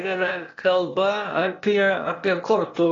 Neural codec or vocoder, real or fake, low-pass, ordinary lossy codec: codec, 16 kHz, 0.8 kbps, ZipCodec; fake; 7.2 kHz; AAC, 32 kbps